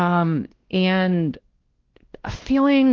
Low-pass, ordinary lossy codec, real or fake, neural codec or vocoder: 7.2 kHz; Opus, 16 kbps; fake; codec, 16 kHz, 1 kbps, X-Codec, WavLM features, trained on Multilingual LibriSpeech